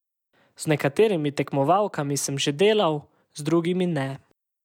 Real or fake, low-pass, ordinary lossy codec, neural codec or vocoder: real; 19.8 kHz; none; none